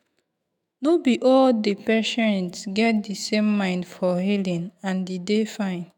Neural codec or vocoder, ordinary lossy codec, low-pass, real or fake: autoencoder, 48 kHz, 128 numbers a frame, DAC-VAE, trained on Japanese speech; none; 19.8 kHz; fake